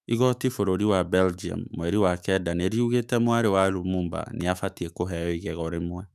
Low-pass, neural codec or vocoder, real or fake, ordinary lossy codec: 14.4 kHz; autoencoder, 48 kHz, 128 numbers a frame, DAC-VAE, trained on Japanese speech; fake; none